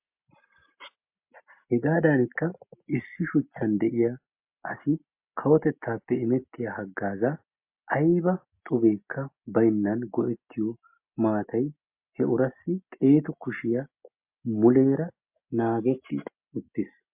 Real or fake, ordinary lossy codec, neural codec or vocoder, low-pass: real; MP3, 32 kbps; none; 3.6 kHz